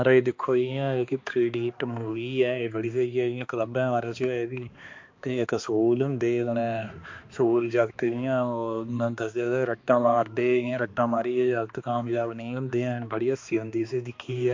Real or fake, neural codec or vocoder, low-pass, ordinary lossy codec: fake; codec, 16 kHz, 2 kbps, X-Codec, HuBERT features, trained on balanced general audio; 7.2 kHz; MP3, 48 kbps